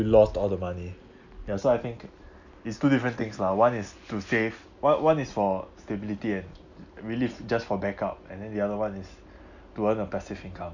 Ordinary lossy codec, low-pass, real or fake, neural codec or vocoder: none; 7.2 kHz; real; none